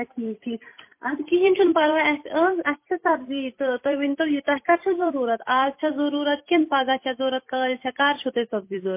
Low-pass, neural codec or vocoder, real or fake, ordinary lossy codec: 3.6 kHz; vocoder, 44.1 kHz, 128 mel bands every 256 samples, BigVGAN v2; fake; MP3, 24 kbps